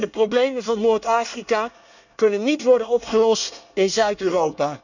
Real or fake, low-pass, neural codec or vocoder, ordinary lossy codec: fake; 7.2 kHz; codec, 24 kHz, 1 kbps, SNAC; none